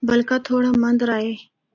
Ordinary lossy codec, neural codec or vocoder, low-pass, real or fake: AAC, 48 kbps; none; 7.2 kHz; real